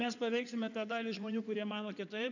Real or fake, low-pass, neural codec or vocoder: fake; 7.2 kHz; codec, 16 kHz in and 24 kHz out, 2.2 kbps, FireRedTTS-2 codec